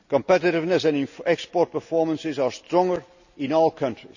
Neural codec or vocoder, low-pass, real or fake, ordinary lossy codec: none; 7.2 kHz; real; none